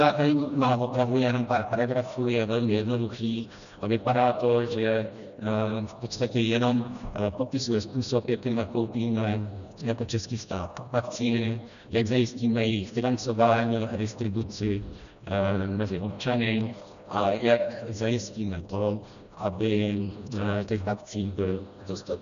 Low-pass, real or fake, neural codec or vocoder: 7.2 kHz; fake; codec, 16 kHz, 1 kbps, FreqCodec, smaller model